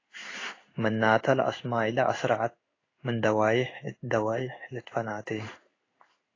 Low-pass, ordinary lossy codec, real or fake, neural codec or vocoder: 7.2 kHz; AAC, 32 kbps; fake; codec, 16 kHz in and 24 kHz out, 1 kbps, XY-Tokenizer